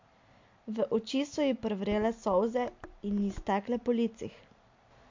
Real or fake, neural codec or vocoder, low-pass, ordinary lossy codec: real; none; 7.2 kHz; MP3, 48 kbps